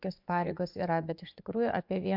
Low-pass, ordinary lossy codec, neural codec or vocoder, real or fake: 5.4 kHz; MP3, 48 kbps; vocoder, 24 kHz, 100 mel bands, Vocos; fake